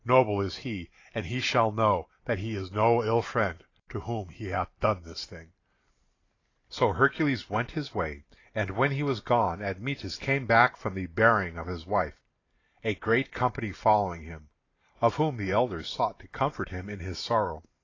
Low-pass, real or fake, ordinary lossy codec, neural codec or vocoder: 7.2 kHz; fake; AAC, 32 kbps; vocoder, 44.1 kHz, 128 mel bands every 512 samples, BigVGAN v2